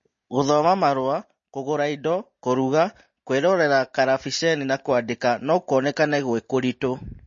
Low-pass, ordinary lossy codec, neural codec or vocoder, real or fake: 7.2 kHz; MP3, 32 kbps; none; real